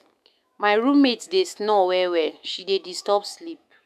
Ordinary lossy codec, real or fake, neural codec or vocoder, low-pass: none; fake; autoencoder, 48 kHz, 128 numbers a frame, DAC-VAE, trained on Japanese speech; 14.4 kHz